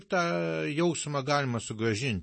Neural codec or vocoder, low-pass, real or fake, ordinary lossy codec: none; 10.8 kHz; real; MP3, 32 kbps